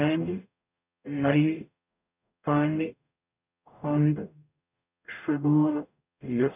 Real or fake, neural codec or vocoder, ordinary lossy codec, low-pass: fake; codec, 44.1 kHz, 0.9 kbps, DAC; none; 3.6 kHz